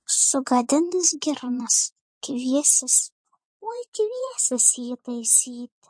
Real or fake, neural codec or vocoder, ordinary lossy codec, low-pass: fake; vocoder, 22.05 kHz, 80 mel bands, Vocos; MP3, 48 kbps; 9.9 kHz